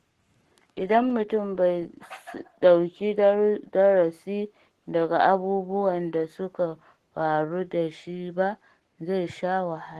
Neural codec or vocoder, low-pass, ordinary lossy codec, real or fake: codec, 44.1 kHz, 7.8 kbps, Pupu-Codec; 14.4 kHz; Opus, 16 kbps; fake